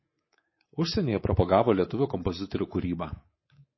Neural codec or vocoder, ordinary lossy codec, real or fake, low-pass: codec, 24 kHz, 3.1 kbps, DualCodec; MP3, 24 kbps; fake; 7.2 kHz